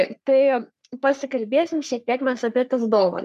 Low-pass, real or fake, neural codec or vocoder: 14.4 kHz; fake; codec, 44.1 kHz, 3.4 kbps, Pupu-Codec